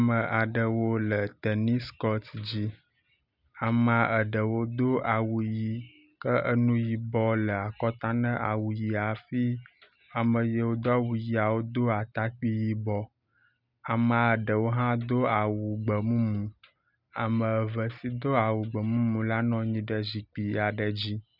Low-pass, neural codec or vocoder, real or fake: 5.4 kHz; none; real